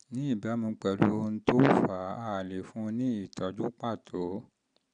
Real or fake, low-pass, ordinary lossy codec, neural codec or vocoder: real; 9.9 kHz; none; none